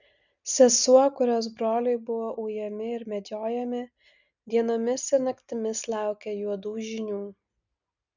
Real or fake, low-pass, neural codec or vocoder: real; 7.2 kHz; none